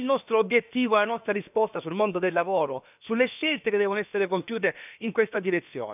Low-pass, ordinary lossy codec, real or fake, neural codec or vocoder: 3.6 kHz; none; fake; codec, 16 kHz, about 1 kbps, DyCAST, with the encoder's durations